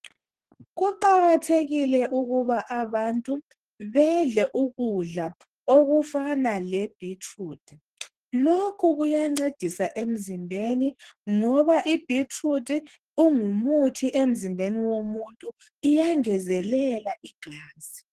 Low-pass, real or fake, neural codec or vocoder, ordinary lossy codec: 14.4 kHz; fake; codec, 32 kHz, 1.9 kbps, SNAC; Opus, 16 kbps